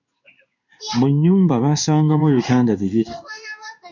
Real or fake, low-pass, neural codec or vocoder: fake; 7.2 kHz; codec, 16 kHz in and 24 kHz out, 1 kbps, XY-Tokenizer